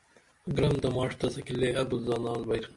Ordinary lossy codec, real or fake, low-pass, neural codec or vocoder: MP3, 64 kbps; real; 10.8 kHz; none